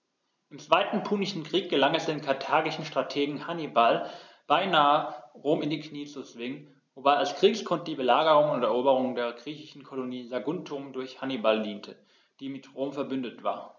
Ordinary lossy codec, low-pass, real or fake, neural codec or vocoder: none; 7.2 kHz; real; none